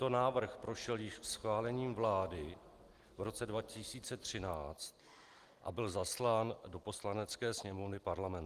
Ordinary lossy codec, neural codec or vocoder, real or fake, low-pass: Opus, 24 kbps; none; real; 14.4 kHz